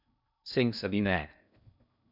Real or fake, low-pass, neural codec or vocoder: fake; 5.4 kHz; codec, 16 kHz in and 24 kHz out, 0.6 kbps, FocalCodec, streaming, 2048 codes